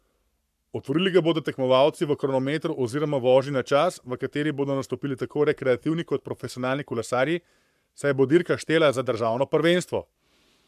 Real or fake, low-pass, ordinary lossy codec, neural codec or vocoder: fake; 14.4 kHz; MP3, 96 kbps; codec, 44.1 kHz, 7.8 kbps, Pupu-Codec